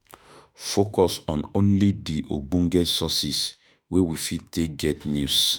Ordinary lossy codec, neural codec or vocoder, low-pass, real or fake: none; autoencoder, 48 kHz, 32 numbers a frame, DAC-VAE, trained on Japanese speech; none; fake